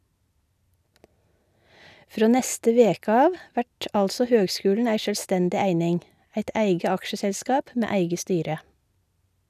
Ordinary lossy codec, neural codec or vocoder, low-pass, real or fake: none; none; 14.4 kHz; real